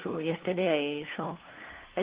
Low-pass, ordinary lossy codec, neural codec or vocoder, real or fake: 3.6 kHz; Opus, 16 kbps; codec, 16 kHz, 2 kbps, FunCodec, trained on LibriTTS, 25 frames a second; fake